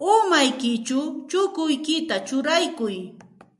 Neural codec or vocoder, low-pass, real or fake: none; 10.8 kHz; real